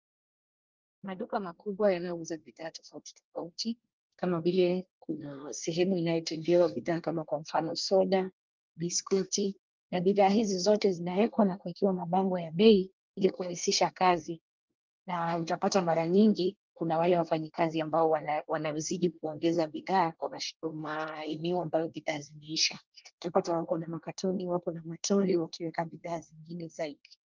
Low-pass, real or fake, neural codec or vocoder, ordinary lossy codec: 7.2 kHz; fake; codec, 24 kHz, 1 kbps, SNAC; Opus, 24 kbps